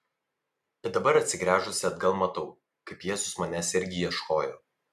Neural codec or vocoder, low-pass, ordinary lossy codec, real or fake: none; 14.4 kHz; MP3, 96 kbps; real